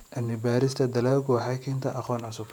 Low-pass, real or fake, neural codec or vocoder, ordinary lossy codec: 19.8 kHz; fake; vocoder, 48 kHz, 128 mel bands, Vocos; none